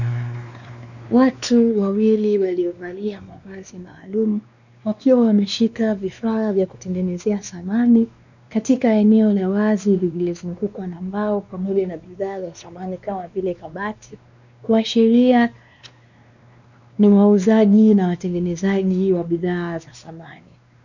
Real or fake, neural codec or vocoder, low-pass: fake; codec, 16 kHz, 2 kbps, X-Codec, WavLM features, trained on Multilingual LibriSpeech; 7.2 kHz